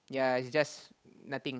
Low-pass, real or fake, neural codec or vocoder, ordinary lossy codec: none; fake; codec, 16 kHz, 8 kbps, FunCodec, trained on Chinese and English, 25 frames a second; none